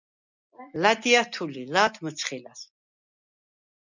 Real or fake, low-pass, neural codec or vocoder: real; 7.2 kHz; none